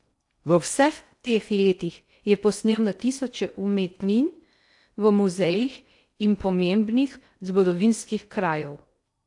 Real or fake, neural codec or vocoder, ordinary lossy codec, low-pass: fake; codec, 16 kHz in and 24 kHz out, 0.6 kbps, FocalCodec, streaming, 2048 codes; AAC, 64 kbps; 10.8 kHz